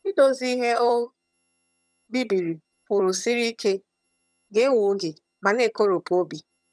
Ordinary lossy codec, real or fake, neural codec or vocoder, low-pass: none; fake; vocoder, 22.05 kHz, 80 mel bands, HiFi-GAN; none